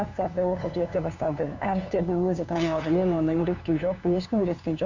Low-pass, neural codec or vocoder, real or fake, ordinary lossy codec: 7.2 kHz; codec, 16 kHz, 2 kbps, FunCodec, trained on LibriTTS, 25 frames a second; fake; none